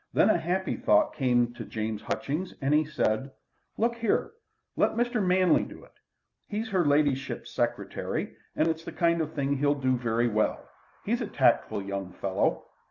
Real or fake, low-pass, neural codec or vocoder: real; 7.2 kHz; none